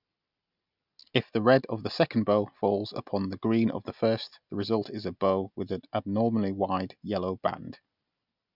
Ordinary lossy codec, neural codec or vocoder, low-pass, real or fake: none; none; 5.4 kHz; real